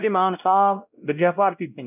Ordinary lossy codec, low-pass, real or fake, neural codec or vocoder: none; 3.6 kHz; fake; codec, 16 kHz, 0.5 kbps, X-Codec, WavLM features, trained on Multilingual LibriSpeech